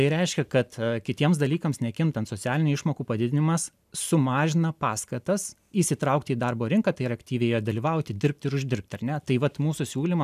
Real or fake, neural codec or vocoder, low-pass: real; none; 14.4 kHz